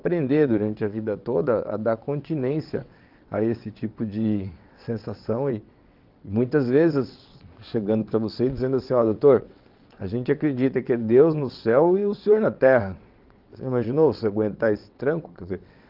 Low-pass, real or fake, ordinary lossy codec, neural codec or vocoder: 5.4 kHz; fake; Opus, 32 kbps; vocoder, 22.05 kHz, 80 mel bands, WaveNeXt